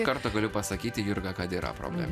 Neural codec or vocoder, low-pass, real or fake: none; 14.4 kHz; real